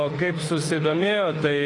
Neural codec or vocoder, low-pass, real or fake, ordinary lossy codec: autoencoder, 48 kHz, 32 numbers a frame, DAC-VAE, trained on Japanese speech; 10.8 kHz; fake; AAC, 32 kbps